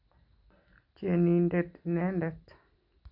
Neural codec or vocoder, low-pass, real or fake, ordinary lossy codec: none; 5.4 kHz; real; none